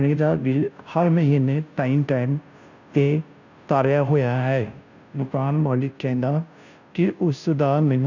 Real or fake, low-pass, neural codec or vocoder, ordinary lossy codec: fake; 7.2 kHz; codec, 16 kHz, 0.5 kbps, FunCodec, trained on Chinese and English, 25 frames a second; none